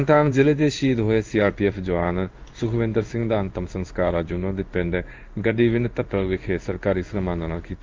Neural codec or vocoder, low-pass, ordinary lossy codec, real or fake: codec, 16 kHz in and 24 kHz out, 1 kbps, XY-Tokenizer; 7.2 kHz; Opus, 32 kbps; fake